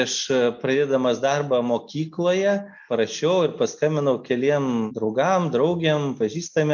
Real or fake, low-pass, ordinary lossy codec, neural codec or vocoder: real; 7.2 kHz; MP3, 64 kbps; none